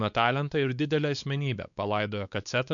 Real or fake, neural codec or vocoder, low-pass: fake; codec, 16 kHz, 4 kbps, X-Codec, WavLM features, trained on Multilingual LibriSpeech; 7.2 kHz